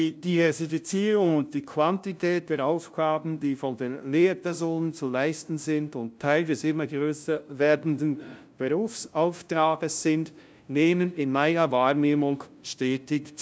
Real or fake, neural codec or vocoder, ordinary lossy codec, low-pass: fake; codec, 16 kHz, 0.5 kbps, FunCodec, trained on LibriTTS, 25 frames a second; none; none